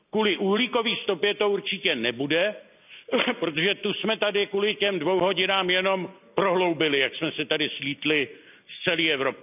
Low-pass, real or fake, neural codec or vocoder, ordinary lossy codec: 3.6 kHz; real; none; none